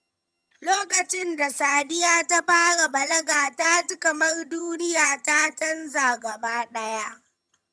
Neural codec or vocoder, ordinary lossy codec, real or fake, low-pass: vocoder, 22.05 kHz, 80 mel bands, HiFi-GAN; none; fake; none